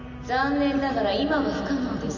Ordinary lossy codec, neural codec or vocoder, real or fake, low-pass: none; none; real; 7.2 kHz